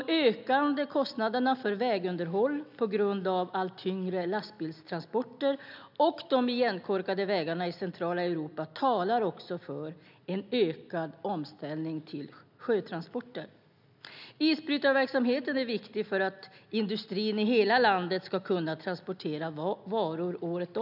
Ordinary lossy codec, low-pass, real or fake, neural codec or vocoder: none; 5.4 kHz; real; none